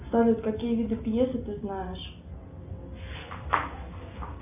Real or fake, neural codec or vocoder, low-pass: real; none; 3.6 kHz